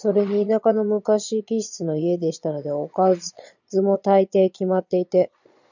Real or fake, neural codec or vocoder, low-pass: fake; vocoder, 22.05 kHz, 80 mel bands, Vocos; 7.2 kHz